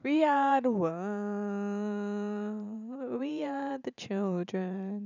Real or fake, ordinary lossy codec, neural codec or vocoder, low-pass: fake; none; vocoder, 44.1 kHz, 128 mel bands every 512 samples, BigVGAN v2; 7.2 kHz